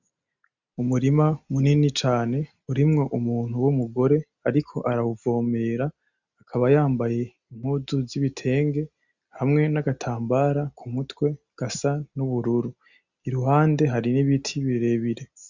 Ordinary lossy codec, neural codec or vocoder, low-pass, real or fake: Opus, 64 kbps; none; 7.2 kHz; real